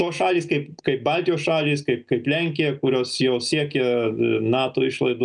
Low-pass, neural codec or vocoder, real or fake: 9.9 kHz; none; real